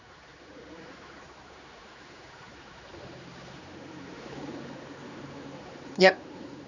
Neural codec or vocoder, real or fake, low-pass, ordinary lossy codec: codec, 16 kHz, 4 kbps, X-Codec, HuBERT features, trained on general audio; fake; 7.2 kHz; none